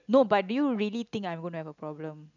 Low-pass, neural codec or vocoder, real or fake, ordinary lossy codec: 7.2 kHz; none; real; none